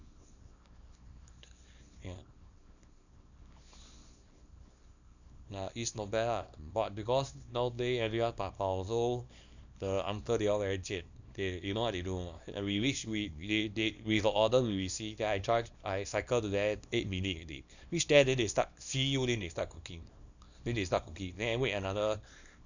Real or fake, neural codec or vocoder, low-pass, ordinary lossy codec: fake; codec, 24 kHz, 0.9 kbps, WavTokenizer, small release; 7.2 kHz; none